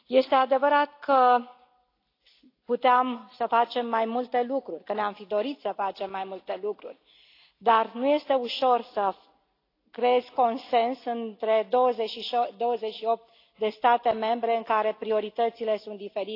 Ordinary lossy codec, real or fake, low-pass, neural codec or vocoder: AAC, 32 kbps; real; 5.4 kHz; none